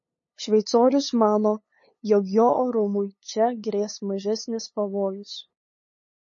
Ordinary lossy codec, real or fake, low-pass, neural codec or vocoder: MP3, 32 kbps; fake; 7.2 kHz; codec, 16 kHz, 8 kbps, FunCodec, trained on LibriTTS, 25 frames a second